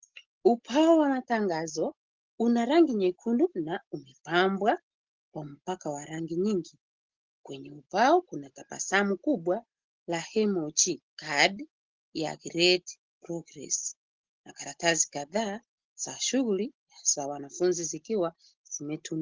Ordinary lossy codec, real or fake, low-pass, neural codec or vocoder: Opus, 16 kbps; real; 7.2 kHz; none